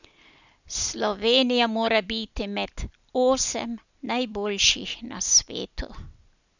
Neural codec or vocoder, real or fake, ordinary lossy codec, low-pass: none; real; none; 7.2 kHz